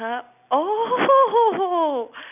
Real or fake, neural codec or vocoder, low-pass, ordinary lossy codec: real; none; 3.6 kHz; none